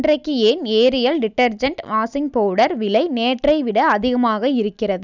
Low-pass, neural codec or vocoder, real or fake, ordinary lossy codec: 7.2 kHz; none; real; none